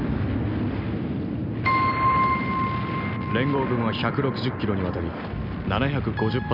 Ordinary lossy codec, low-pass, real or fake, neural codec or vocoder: none; 5.4 kHz; real; none